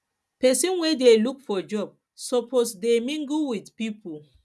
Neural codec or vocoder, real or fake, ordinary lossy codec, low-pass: none; real; none; none